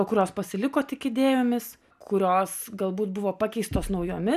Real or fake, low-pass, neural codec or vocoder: real; 14.4 kHz; none